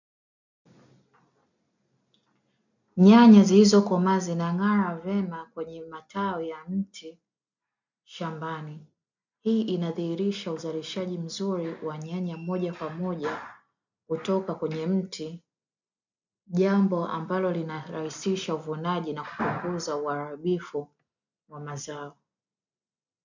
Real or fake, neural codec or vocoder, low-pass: real; none; 7.2 kHz